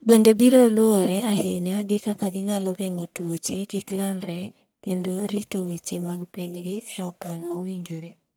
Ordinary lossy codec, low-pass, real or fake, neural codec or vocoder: none; none; fake; codec, 44.1 kHz, 1.7 kbps, Pupu-Codec